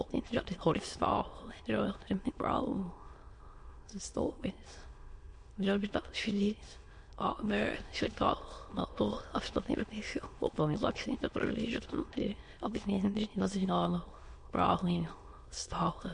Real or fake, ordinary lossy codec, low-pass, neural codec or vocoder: fake; MP3, 48 kbps; 9.9 kHz; autoencoder, 22.05 kHz, a latent of 192 numbers a frame, VITS, trained on many speakers